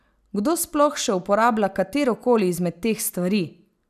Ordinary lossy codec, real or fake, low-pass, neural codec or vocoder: none; real; 14.4 kHz; none